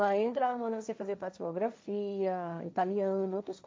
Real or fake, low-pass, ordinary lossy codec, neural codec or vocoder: fake; none; none; codec, 16 kHz, 1.1 kbps, Voila-Tokenizer